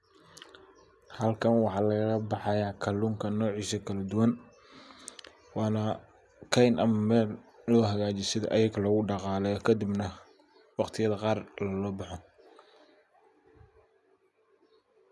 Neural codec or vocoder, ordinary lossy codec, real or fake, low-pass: none; none; real; none